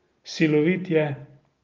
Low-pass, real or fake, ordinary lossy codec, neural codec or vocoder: 7.2 kHz; real; Opus, 24 kbps; none